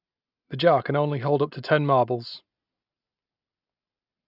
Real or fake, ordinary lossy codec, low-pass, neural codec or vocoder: real; none; 5.4 kHz; none